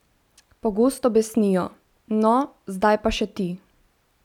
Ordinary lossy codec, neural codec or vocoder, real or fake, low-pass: none; none; real; 19.8 kHz